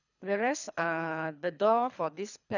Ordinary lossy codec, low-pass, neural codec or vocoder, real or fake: none; 7.2 kHz; codec, 24 kHz, 3 kbps, HILCodec; fake